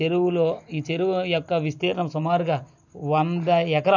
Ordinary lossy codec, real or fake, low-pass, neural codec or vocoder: none; real; 7.2 kHz; none